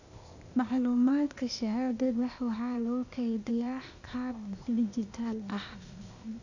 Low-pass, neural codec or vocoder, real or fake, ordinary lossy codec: 7.2 kHz; codec, 16 kHz, 0.8 kbps, ZipCodec; fake; none